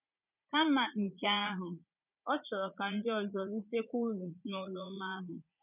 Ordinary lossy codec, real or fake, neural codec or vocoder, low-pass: none; fake; vocoder, 44.1 kHz, 80 mel bands, Vocos; 3.6 kHz